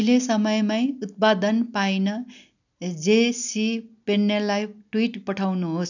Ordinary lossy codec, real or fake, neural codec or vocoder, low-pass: none; real; none; 7.2 kHz